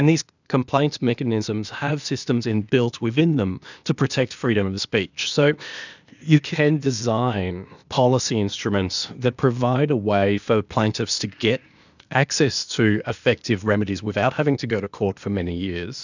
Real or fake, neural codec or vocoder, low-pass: fake; codec, 16 kHz, 0.8 kbps, ZipCodec; 7.2 kHz